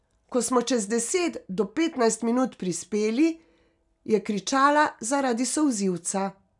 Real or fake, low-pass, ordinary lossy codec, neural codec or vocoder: real; 10.8 kHz; none; none